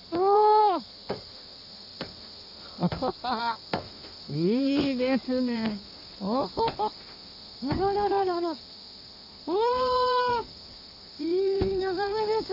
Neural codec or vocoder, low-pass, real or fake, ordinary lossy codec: codec, 16 kHz in and 24 kHz out, 1.1 kbps, FireRedTTS-2 codec; 5.4 kHz; fake; none